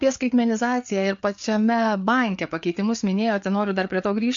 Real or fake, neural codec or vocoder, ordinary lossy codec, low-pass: fake; codec, 16 kHz, 4 kbps, FreqCodec, larger model; MP3, 48 kbps; 7.2 kHz